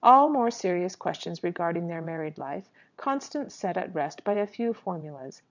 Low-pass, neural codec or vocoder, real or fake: 7.2 kHz; vocoder, 22.05 kHz, 80 mel bands, WaveNeXt; fake